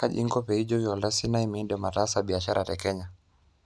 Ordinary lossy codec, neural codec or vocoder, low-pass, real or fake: none; none; none; real